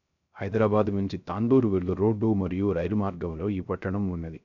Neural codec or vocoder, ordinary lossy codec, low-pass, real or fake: codec, 16 kHz, 0.3 kbps, FocalCodec; none; 7.2 kHz; fake